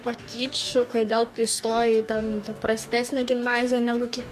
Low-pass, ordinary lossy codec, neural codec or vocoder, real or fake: 14.4 kHz; AAC, 96 kbps; codec, 44.1 kHz, 2.6 kbps, DAC; fake